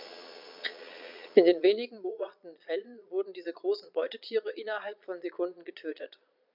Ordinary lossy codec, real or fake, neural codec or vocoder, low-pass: none; fake; vocoder, 22.05 kHz, 80 mel bands, Vocos; 5.4 kHz